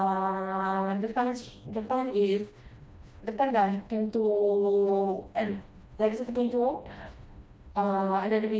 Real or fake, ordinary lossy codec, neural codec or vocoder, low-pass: fake; none; codec, 16 kHz, 1 kbps, FreqCodec, smaller model; none